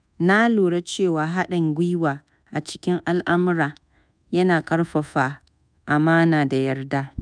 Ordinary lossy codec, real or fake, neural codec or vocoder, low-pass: none; fake; codec, 24 kHz, 1.2 kbps, DualCodec; 9.9 kHz